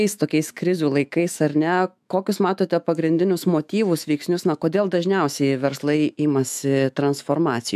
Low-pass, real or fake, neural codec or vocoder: 14.4 kHz; fake; autoencoder, 48 kHz, 128 numbers a frame, DAC-VAE, trained on Japanese speech